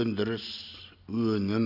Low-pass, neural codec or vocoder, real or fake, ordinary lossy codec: 5.4 kHz; none; real; none